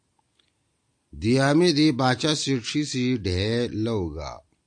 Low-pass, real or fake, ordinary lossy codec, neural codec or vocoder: 9.9 kHz; real; AAC, 64 kbps; none